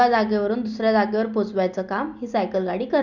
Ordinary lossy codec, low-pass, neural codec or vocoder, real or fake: none; 7.2 kHz; none; real